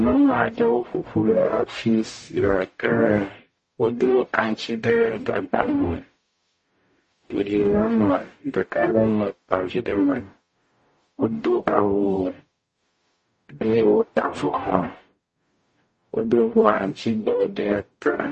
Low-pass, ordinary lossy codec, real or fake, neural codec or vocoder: 10.8 kHz; MP3, 32 kbps; fake; codec, 44.1 kHz, 0.9 kbps, DAC